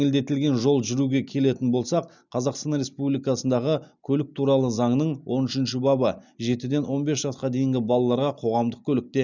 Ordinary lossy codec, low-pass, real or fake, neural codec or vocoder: none; 7.2 kHz; real; none